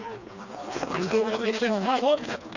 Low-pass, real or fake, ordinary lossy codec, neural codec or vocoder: 7.2 kHz; fake; none; codec, 16 kHz, 2 kbps, FreqCodec, smaller model